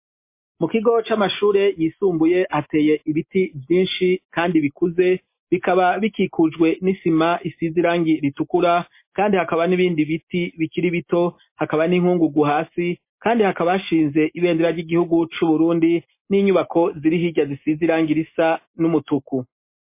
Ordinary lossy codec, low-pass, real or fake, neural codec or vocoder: MP3, 24 kbps; 3.6 kHz; real; none